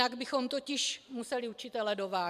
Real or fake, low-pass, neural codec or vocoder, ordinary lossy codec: fake; 14.4 kHz; vocoder, 44.1 kHz, 128 mel bands every 256 samples, BigVGAN v2; MP3, 96 kbps